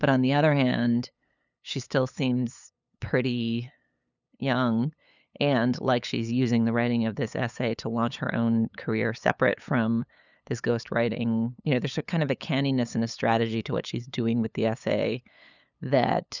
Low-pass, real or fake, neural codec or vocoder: 7.2 kHz; fake; codec, 16 kHz, 8 kbps, FunCodec, trained on LibriTTS, 25 frames a second